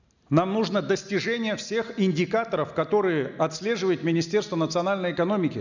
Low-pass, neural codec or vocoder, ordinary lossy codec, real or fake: 7.2 kHz; none; none; real